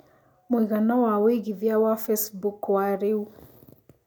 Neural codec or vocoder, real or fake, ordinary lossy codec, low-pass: none; real; none; 19.8 kHz